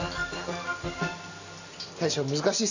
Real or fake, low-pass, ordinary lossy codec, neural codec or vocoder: real; 7.2 kHz; none; none